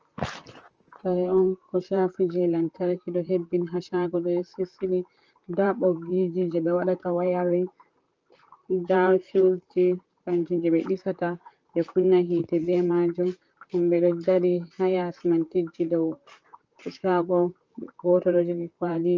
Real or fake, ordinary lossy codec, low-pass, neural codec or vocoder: fake; Opus, 24 kbps; 7.2 kHz; vocoder, 44.1 kHz, 128 mel bands, Pupu-Vocoder